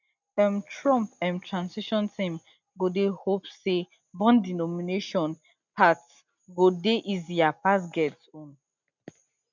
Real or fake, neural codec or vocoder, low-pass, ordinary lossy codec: real; none; 7.2 kHz; none